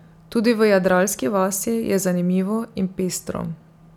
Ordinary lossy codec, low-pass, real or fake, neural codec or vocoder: none; 19.8 kHz; real; none